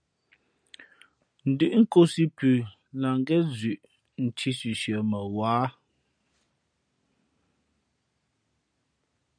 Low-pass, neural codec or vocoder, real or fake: 9.9 kHz; none; real